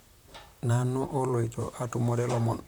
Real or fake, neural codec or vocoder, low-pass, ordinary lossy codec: fake; vocoder, 44.1 kHz, 128 mel bands, Pupu-Vocoder; none; none